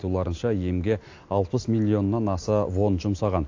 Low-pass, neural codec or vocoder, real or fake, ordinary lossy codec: 7.2 kHz; none; real; AAC, 48 kbps